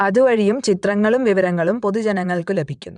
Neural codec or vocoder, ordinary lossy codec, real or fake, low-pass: vocoder, 22.05 kHz, 80 mel bands, WaveNeXt; none; fake; 9.9 kHz